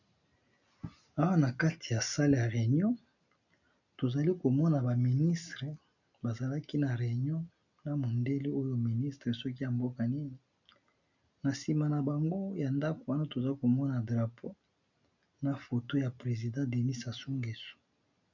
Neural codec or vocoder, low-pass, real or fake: none; 7.2 kHz; real